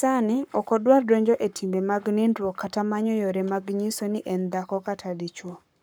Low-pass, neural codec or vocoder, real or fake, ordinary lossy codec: none; codec, 44.1 kHz, 7.8 kbps, Pupu-Codec; fake; none